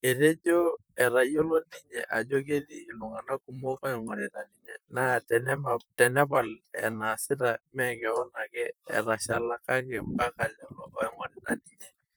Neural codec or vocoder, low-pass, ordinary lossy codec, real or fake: vocoder, 44.1 kHz, 128 mel bands, Pupu-Vocoder; none; none; fake